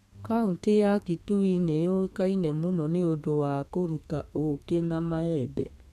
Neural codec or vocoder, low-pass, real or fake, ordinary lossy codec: codec, 32 kHz, 1.9 kbps, SNAC; 14.4 kHz; fake; none